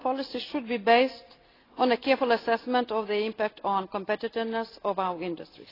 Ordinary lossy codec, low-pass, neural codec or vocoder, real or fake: AAC, 24 kbps; 5.4 kHz; none; real